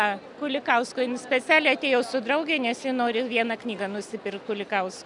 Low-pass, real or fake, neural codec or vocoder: 10.8 kHz; real; none